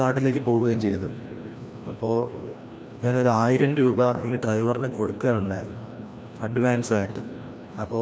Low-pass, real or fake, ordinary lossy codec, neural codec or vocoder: none; fake; none; codec, 16 kHz, 1 kbps, FreqCodec, larger model